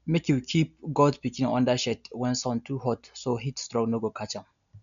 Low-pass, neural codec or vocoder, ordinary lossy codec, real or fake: 7.2 kHz; none; none; real